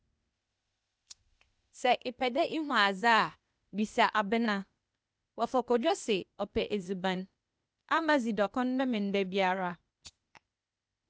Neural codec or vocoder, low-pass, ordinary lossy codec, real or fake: codec, 16 kHz, 0.8 kbps, ZipCodec; none; none; fake